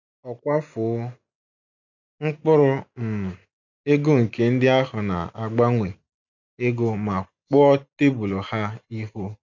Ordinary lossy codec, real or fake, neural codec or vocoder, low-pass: none; real; none; 7.2 kHz